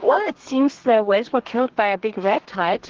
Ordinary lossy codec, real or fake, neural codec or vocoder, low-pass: Opus, 16 kbps; fake; codec, 32 kHz, 1.9 kbps, SNAC; 7.2 kHz